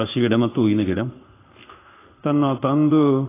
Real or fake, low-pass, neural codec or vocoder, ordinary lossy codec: fake; 3.6 kHz; codec, 16 kHz in and 24 kHz out, 1 kbps, XY-Tokenizer; AAC, 16 kbps